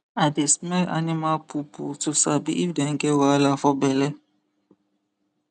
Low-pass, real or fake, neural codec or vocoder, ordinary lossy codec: 10.8 kHz; real; none; none